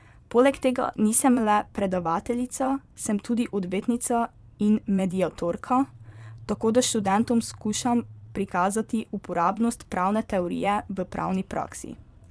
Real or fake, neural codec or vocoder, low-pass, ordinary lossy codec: fake; vocoder, 22.05 kHz, 80 mel bands, Vocos; none; none